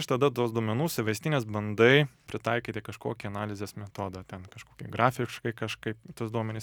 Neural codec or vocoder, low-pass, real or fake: none; 19.8 kHz; real